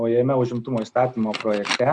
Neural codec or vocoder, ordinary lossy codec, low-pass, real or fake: none; Opus, 64 kbps; 10.8 kHz; real